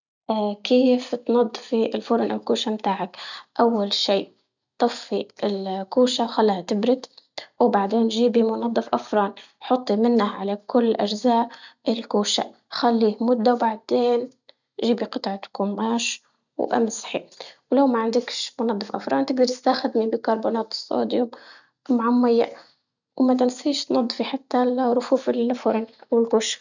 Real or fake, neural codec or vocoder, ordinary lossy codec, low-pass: real; none; none; 7.2 kHz